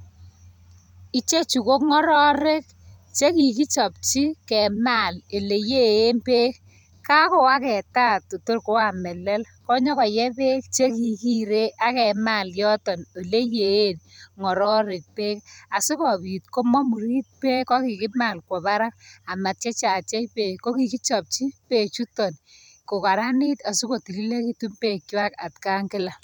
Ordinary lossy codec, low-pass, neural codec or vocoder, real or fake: none; 19.8 kHz; vocoder, 44.1 kHz, 128 mel bands every 512 samples, BigVGAN v2; fake